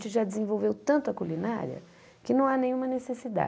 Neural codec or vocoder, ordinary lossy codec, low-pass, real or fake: none; none; none; real